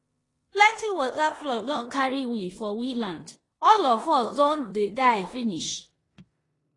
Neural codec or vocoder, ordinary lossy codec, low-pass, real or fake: codec, 16 kHz in and 24 kHz out, 0.9 kbps, LongCat-Audio-Codec, four codebook decoder; AAC, 32 kbps; 10.8 kHz; fake